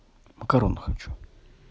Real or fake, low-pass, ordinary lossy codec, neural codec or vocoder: real; none; none; none